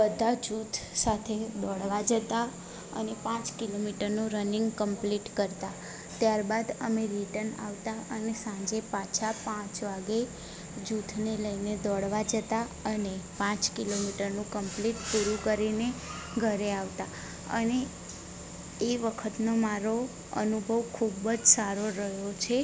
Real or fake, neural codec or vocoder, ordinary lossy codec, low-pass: real; none; none; none